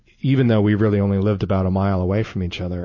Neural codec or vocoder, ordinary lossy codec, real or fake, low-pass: none; MP3, 32 kbps; real; 7.2 kHz